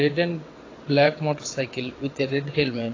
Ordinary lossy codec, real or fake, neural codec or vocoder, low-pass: AAC, 32 kbps; fake; vocoder, 22.05 kHz, 80 mel bands, WaveNeXt; 7.2 kHz